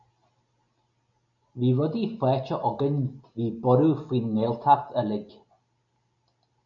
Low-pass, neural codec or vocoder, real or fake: 7.2 kHz; none; real